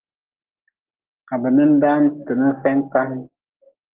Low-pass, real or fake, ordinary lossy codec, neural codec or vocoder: 3.6 kHz; real; Opus, 16 kbps; none